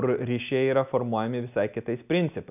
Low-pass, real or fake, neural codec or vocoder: 3.6 kHz; real; none